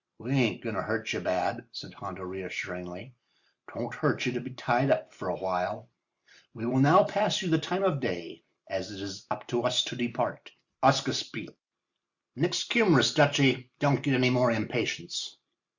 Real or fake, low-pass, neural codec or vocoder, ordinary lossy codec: real; 7.2 kHz; none; Opus, 64 kbps